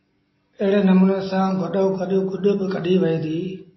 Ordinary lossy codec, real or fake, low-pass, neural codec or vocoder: MP3, 24 kbps; real; 7.2 kHz; none